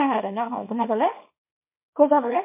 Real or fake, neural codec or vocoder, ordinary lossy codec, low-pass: fake; codec, 24 kHz, 0.9 kbps, WavTokenizer, small release; MP3, 32 kbps; 3.6 kHz